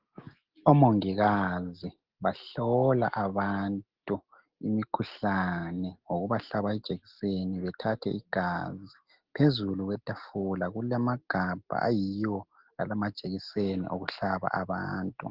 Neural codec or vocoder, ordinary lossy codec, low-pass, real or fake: none; Opus, 16 kbps; 5.4 kHz; real